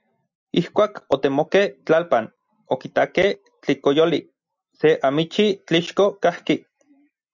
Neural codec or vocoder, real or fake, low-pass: none; real; 7.2 kHz